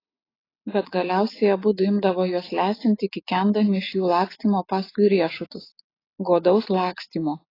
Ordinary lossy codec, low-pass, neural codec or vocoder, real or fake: AAC, 24 kbps; 5.4 kHz; none; real